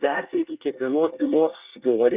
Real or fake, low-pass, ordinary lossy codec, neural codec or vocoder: fake; 3.6 kHz; Opus, 64 kbps; codec, 24 kHz, 1 kbps, SNAC